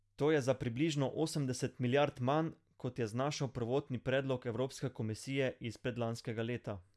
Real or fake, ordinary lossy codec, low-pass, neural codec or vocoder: real; none; none; none